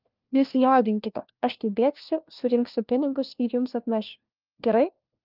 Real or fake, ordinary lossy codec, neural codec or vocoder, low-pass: fake; Opus, 32 kbps; codec, 16 kHz, 1 kbps, FunCodec, trained on LibriTTS, 50 frames a second; 5.4 kHz